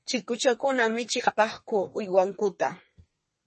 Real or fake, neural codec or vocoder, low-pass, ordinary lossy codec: fake; codec, 32 kHz, 1.9 kbps, SNAC; 10.8 kHz; MP3, 32 kbps